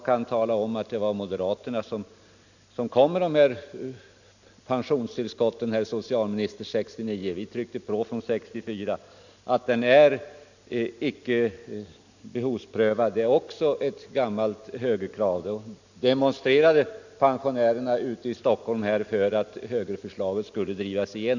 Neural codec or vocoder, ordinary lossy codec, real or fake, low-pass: none; none; real; 7.2 kHz